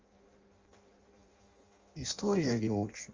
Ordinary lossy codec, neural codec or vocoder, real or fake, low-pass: Opus, 32 kbps; codec, 16 kHz in and 24 kHz out, 0.6 kbps, FireRedTTS-2 codec; fake; 7.2 kHz